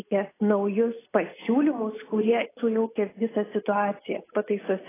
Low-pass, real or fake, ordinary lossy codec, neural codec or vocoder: 3.6 kHz; real; AAC, 16 kbps; none